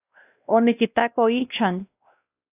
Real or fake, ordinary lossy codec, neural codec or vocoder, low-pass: fake; AAC, 32 kbps; codec, 16 kHz, 1 kbps, X-Codec, WavLM features, trained on Multilingual LibriSpeech; 3.6 kHz